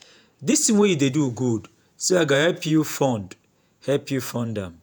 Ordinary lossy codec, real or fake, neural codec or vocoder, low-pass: none; fake; vocoder, 48 kHz, 128 mel bands, Vocos; none